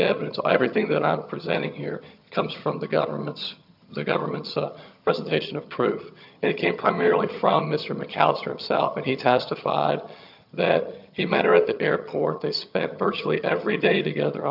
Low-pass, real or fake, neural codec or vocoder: 5.4 kHz; fake; vocoder, 22.05 kHz, 80 mel bands, HiFi-GAN